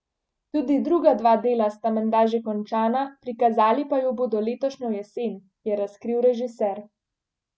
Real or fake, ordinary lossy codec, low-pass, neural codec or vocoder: real; none; none; none